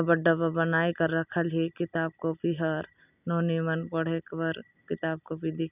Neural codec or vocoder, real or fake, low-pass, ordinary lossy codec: none; real; 3.6 kHz; none